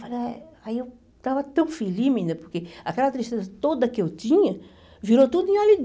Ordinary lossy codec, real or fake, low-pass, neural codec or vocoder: none; real; none; none